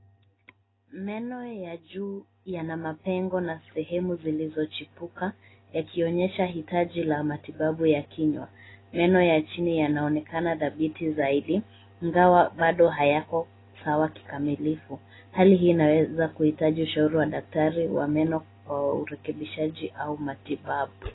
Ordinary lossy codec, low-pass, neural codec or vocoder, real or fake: AAC, 16 kbps; 7.2 kHz; none; real